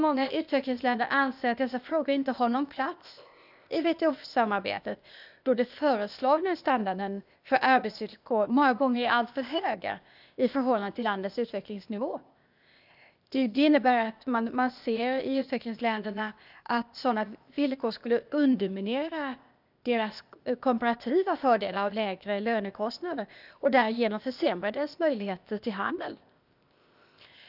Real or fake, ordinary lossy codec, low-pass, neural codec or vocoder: fake; none; 5.4 kHz; codec, 16 kHz, 0.8 kbps, ZipCodec